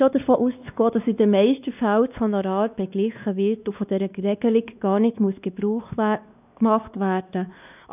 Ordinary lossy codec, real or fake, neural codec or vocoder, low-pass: none; fake; codec, 16 kHz, 2 kbps, X-Codec, WavLM features, trained on Multilingual LibriSpeech; 3.6 kHz